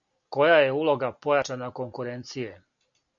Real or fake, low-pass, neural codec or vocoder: real; 7.2 kHz; none